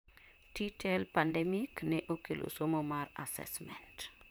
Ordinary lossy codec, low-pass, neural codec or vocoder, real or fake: none; none; vocoder, 44.1 kHz, 128 mel bands, Pupu-Vocoder; fake